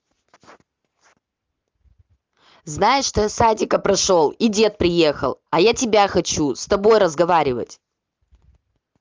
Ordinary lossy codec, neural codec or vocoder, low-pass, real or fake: Opus, 32 kbps; none; 7.2 kHz; real